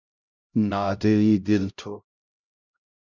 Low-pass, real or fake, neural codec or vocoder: 7.2 kHz; fake; codec, 16 kHz, 0.5 kbps, X-Codec, HuBERT features, trained on LibriSpeech